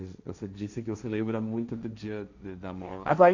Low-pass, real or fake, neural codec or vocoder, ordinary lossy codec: 7.2 kHz; fake; codec, 16 kHz, 1.1 kbps, Voila-Tokenizer; none